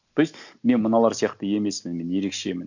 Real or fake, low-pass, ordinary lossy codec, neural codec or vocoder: real; 7.2 kHz; none; none